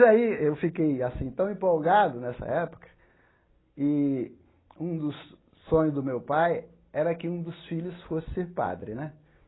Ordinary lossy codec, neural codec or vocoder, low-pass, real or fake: AAC, 16 kbps; none; 7.2 kHz; real